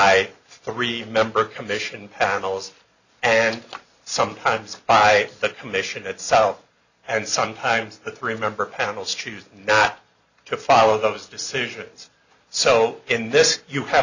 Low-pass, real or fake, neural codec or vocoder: 7.2 kHz; real; none